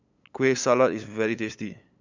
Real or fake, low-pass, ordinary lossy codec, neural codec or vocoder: fake; 7.2 kHz; none; codec, 16 kHz, 8 kbps, FunCodec, trained on LibriTTS, 25 frames a second